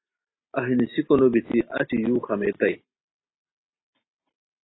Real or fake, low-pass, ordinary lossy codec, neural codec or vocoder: real; 7.2 kHz; AAC, 16 kbps; none